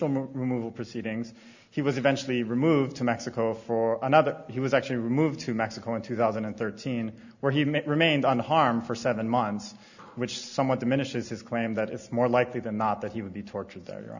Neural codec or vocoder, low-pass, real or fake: none; 7.2 kHz; real